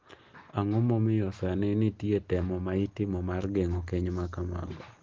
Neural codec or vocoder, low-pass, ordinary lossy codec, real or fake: none; 7.2 kHz; Opus, 16 kbps; real